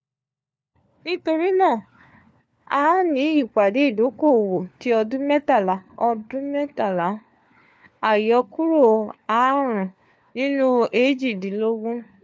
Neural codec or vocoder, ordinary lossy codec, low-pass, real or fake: codec, 16 kHz, 4 kbps, FunCodec, trained on LibriTTS, 50 frames a second; none; none; fake